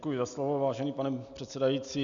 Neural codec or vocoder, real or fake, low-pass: none; real; 7.2 kHz